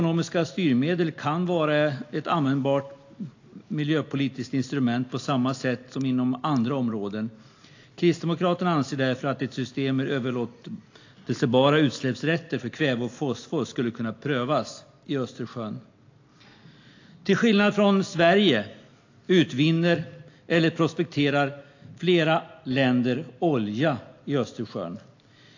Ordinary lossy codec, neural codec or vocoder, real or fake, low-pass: AAC, 48 kbps; none; real; 7.2 kHz